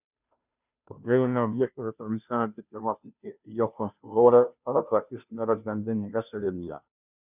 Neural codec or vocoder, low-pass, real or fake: codec, 16 kHz, 0.5 kbps, FunCodec, trained on Chinese and English, 25 frames a second; 3.6 kHz; fake